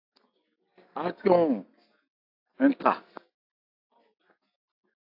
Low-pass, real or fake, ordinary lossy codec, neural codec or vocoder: 5.4 kHz; real; AAC, 32 kbps; none